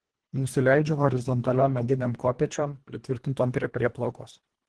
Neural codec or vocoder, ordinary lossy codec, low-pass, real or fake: codec, 24 kHz, 1.5 kbps, HILCodec; Opus, 16 kbps; 10.8 kHz; fake